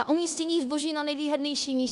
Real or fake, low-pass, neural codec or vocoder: fake; 10.8 kHz; codec, 16 kHz in and 24 kHz out, 0.9 kbps, LongCat-Audio-Codec, four codebook decoder